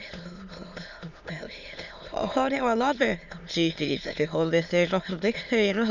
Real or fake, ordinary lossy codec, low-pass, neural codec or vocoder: fake; none; 7.2 kHz; autoencoder, 22.05 kHz, a latent of 192 numbers a frame, VITS, trained on many speakers